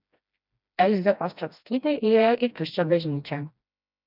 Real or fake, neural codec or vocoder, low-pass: fake; codec, 16 kHz, 1 kbps, FreqCodec, smaller model; 5.4 kHz